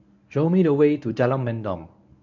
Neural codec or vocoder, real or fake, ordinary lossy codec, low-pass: codec, 24 kHz, 0.9 kbps, WavTokenizer, medium speech release version 1; fake; none; 7.2 kHz